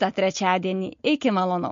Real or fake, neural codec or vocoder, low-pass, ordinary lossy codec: real; none; 7.2 kHz; MP3, 48 kbps